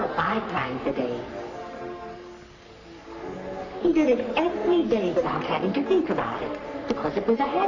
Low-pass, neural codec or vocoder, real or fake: 7.2 kHz; codec, 44.1 kHz, 3.4 kbps, Pupu-Codec; fake